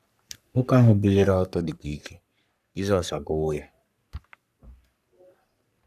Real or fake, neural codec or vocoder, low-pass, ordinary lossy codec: fake; codec, 44.1 kHz, 3.4 kbps, Pupu-Codec; 14.4 kHz; none